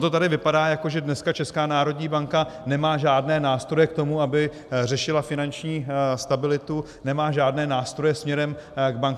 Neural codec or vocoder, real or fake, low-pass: none; real; 14.4 kHz